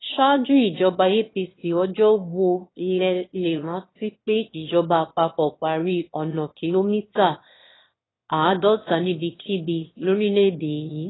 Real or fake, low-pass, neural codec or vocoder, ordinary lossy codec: fake; 7.2 kHz; autoencoder, 22.05 kHz, a latent of 192 numbers a frame, VITS, trained on one speaker; AAC, 16 kbps